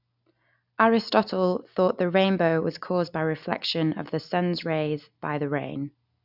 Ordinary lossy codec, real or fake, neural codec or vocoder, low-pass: none; real; none; 5.4 kHz